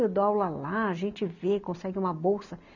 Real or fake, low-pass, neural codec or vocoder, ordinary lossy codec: real; 7.2 kHz; none; none